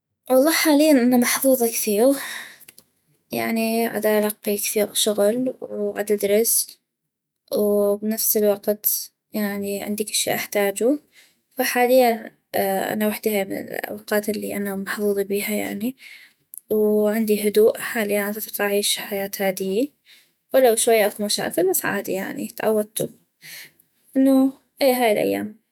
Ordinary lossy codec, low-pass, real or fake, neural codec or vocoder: none; none; fake; autoencoder, 48 kHz, 128 numbers a frame, DAC-VAE, trained on Japanese speech